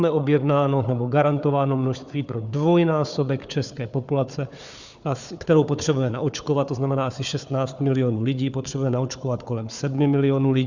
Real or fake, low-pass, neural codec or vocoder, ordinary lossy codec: fake; 7.2 kHz; codec, 16 kHz, 4 kbps, FunCodec, trained on Chinese and English, 50 frames a second; Opus, 64 kbps